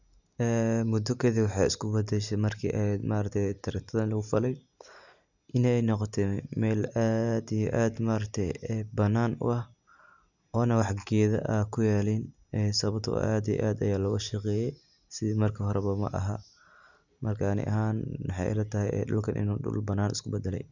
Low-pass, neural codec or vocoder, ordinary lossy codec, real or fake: 7.2 kHz; none; none; real